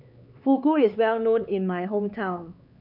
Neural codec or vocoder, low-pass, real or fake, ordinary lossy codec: codec, 16 kHz, 4 kbps, X-Codec, HuBERT features, trained on LibriSpeech; 5.4 kHz; fake; none